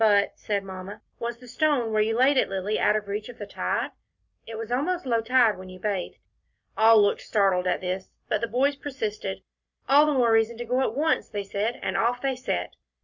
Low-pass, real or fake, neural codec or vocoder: 7.2 kHz; real; none